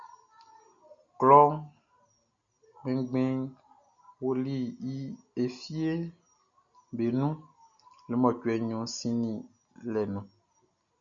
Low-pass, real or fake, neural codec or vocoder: 7.2 kHz; real; none